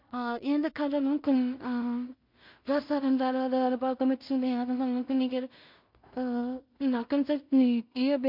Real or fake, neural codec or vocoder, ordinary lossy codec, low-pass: fake; codec, 16 kHz in and 24 kHz out, 0.4 kbps, LongCat-Audio-Codec, two codebook decoder; MP3, 48 kbps; 5.4 kHz